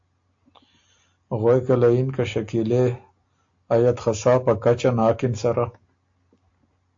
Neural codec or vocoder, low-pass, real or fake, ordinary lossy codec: none; 7.2 kHz; real; AAC, 48 kbps